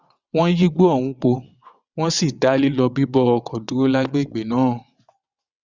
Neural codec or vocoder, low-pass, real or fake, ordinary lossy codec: vocoder, 22.05 kHz, 80 mel bands, WaveNeXt; 7.2 kHz; fake; Opus, 64 kbps